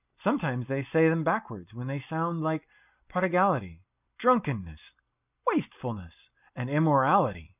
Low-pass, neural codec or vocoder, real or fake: 3.6 kHz; none; real